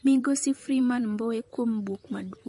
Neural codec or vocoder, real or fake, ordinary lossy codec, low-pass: vocoder, 44.1 kHz, 128 mel bands, Pupu-Vocoder; fake; MP3, 48 kbps; 14.4 kHz